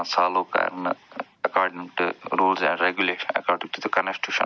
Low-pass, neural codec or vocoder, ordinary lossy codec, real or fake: none; none; none; real